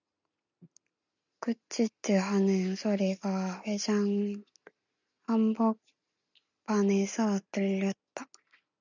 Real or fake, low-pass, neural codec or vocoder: real; 7.2 kHz; none